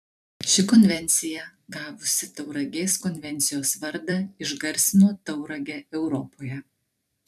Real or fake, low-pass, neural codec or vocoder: fake; 14.4 kHz; vocoder, 44.1 kHz, 128 mel bands every 256 samples, BigVGAN v2